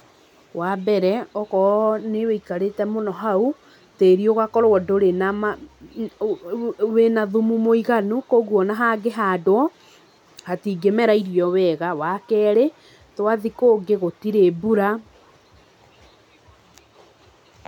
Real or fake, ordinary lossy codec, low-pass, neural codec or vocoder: real; none; 19.8 kHz; none